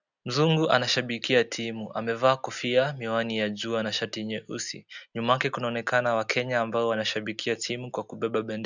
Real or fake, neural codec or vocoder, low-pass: real; none; 7.2 kHz